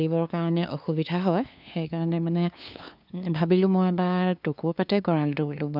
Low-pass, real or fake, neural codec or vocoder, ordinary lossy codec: 5.4 kHz; fake; codec, 16 kHz, 2 kbps, FunCodec, trained on LibriTTS, 25 frames a second; none